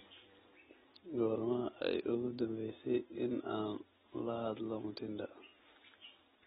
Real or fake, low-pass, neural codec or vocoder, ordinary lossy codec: real; 10.8 kHz; none; AAC, 16 kbps